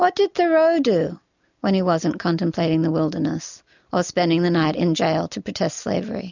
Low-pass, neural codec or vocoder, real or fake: 7.2 kHz; none; real